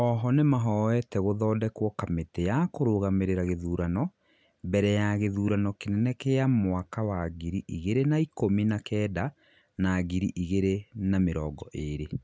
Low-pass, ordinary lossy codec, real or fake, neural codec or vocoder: none; none; real; none